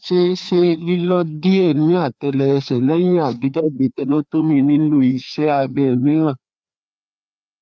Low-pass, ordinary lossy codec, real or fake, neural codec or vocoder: none; none; fake; codec, 16 kHz, 2 kbps, FreqCodec, larger model